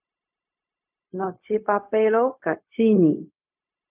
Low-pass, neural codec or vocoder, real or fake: 3.6 kHz; codec, 16 kHz, 0.4 kbps, LongCat-Audio-Codec; fake